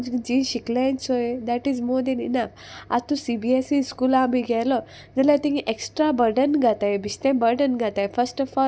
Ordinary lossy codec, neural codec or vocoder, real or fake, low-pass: none; none; real; none